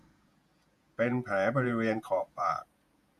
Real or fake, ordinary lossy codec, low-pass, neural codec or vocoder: fake; none; 14.4 kHz; vocoder, 48 kHz, 128 mel bands, Vocos